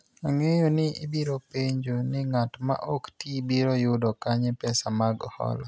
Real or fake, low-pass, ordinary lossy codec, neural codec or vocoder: real; none; none; none